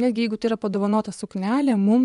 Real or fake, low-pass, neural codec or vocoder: fake; 10.8 kHz; vocoder, 24 kHz, 100 mel bands, Vocos